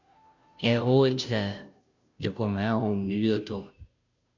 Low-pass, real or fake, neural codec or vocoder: 7.2 kHz; fake; codec, 16 kHz, 0.5 kbps, FunCodec, trained on Chinese and English, 25 frames a second